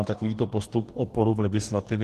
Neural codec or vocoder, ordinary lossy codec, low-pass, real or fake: codec, 44.1 kHz, 2.6 kbps, DAC; Opus, 16 kbps; 14.4 kHz; fake